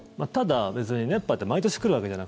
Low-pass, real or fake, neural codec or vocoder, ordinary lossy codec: none; real; none; none